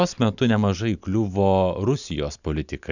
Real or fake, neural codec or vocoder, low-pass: real; none; 7.2 kHz